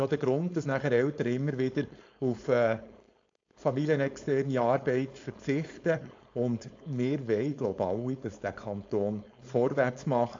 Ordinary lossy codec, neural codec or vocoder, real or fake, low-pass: AAC, 48 kbps; codec, 16 kHz, 4.8 kbps, FACodec; fake; 7.2 kHz